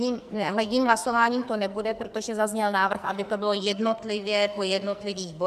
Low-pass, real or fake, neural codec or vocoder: 14.4 kHz; fake; codec, 44.1 kHz, 2.6 kbps, SNAC